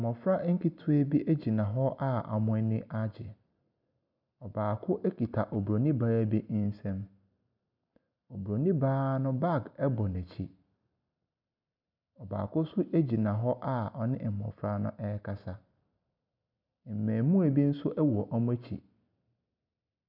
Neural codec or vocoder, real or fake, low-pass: none; real; 5.4 kHz